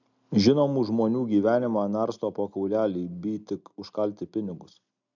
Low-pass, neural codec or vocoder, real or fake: 7.2 kHz; none; real